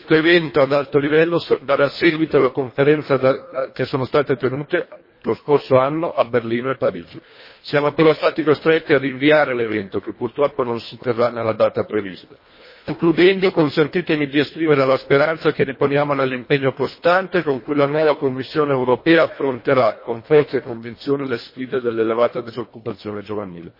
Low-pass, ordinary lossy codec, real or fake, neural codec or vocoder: 5.4 kHz; MP3, 24 kbps; fake; codec, 24 kHz, 1.5 kbps, HILCodec